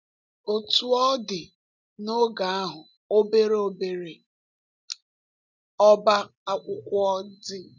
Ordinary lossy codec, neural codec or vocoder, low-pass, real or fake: none; none; 7.2 kHz; real